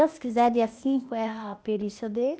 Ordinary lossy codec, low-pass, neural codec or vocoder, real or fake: none; none; codec, 16 kHz, 0.8 kbps, ZipCodec; fake